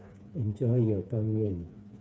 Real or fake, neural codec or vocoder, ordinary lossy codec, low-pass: fake; codec, 16 kHz, 4 kbps, FreqCodec, smaller model; none; none